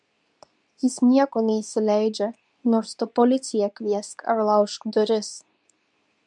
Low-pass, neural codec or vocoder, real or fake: 10.8 kHz; codec, 24 kHz, 0.9 kbps, WavTokenizer, medium speech release version 2; fake